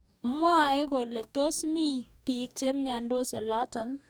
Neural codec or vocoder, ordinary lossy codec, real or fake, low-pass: codec, 44.1 kHz, 2.6 kbps, DAC; none; fake; none